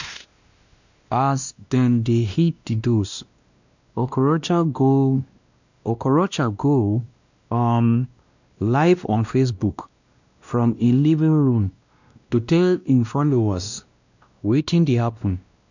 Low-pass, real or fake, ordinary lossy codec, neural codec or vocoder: 7.2 kHz; fake; none; codec, 16 kHz, 1 kbps, X-Codec, WavLM features, trained on Multilingual LibriSpeech